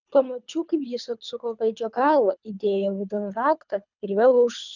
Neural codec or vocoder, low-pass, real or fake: codec, 24 kHz, 3 kbps, HILCodec; 7.2 kHz; fake